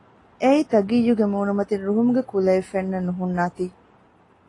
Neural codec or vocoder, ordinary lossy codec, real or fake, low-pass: none; AAC, 32 kbps; real; 10.8 kHz